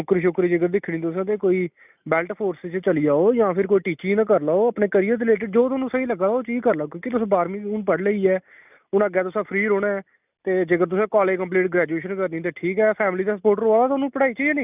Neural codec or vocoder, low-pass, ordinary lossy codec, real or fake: none; 3.6 kHz; none; real